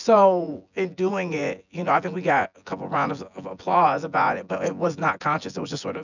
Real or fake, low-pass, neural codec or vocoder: fake; 7.2 kHz; vocoder, 24 kHz, 100 mel bands, Vocos